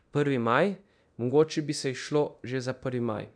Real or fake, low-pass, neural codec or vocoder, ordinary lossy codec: fake; 9.9 kHz; codec, 24 kHz, 0.9 kbps, DualCodec; none